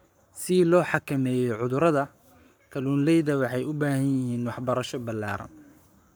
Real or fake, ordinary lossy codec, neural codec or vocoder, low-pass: fake; none; codec, 44.1 kHz, 7.8 kbps, Pupu-Codec; none